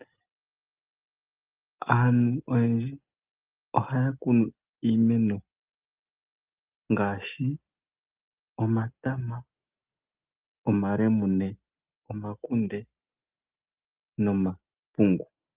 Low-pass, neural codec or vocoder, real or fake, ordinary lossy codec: 3.6 kHz; none; real; Opus, 24 kbps